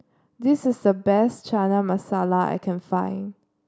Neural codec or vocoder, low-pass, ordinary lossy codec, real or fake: none; none; none; real